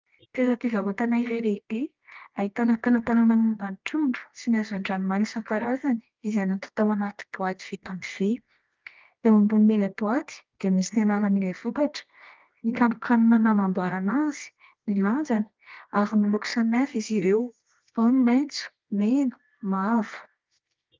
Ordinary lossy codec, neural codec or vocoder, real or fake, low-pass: Opus, 32 kbps; codec, 24 kHz, 0.9 kbps, WavTokenizer, medium music audio release; fake; 7.2 kHz